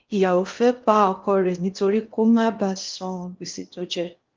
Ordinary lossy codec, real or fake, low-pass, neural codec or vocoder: Opus, 32 kbps; fake; 7.2 kHz; codec, 16 kHz in and 24 kHz out, 0.8 kbps, FocalCodec, streaming, 65536 codes